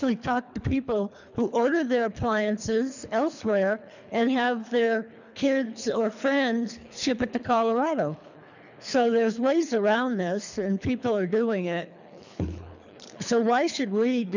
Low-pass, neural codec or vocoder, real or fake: 7.2 kHz; codec, 24 kHz, 3 kbps, HILCodec; fake